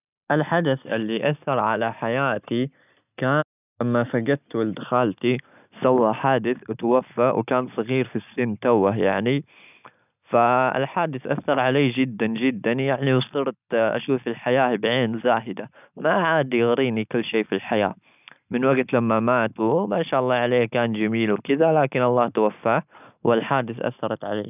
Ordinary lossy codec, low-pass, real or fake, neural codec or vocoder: none; 3.6 kHz; real; none